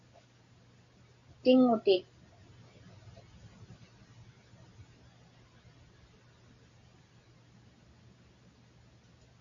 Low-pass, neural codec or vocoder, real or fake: 7.2 kHz; none; real